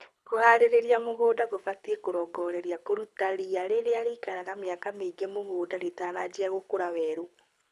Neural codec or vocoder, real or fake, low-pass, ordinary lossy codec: codec, 24 kHz, 6 kbps, HILCodec; fake; none; none